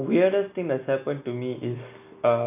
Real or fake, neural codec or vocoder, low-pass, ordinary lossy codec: real; none; 3.6 kHz; none